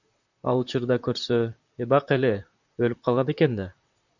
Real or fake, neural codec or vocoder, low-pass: fake; vocoder, 44.1 kHz, 128 mel bands, Pupu-Vocoder; 7.2 kHz